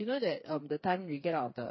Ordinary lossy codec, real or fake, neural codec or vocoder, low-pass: MP3, 24 kbps; fake; codec, 16 kHz, 4 kbps, FreqCodec, smaller model; 7.2 kHz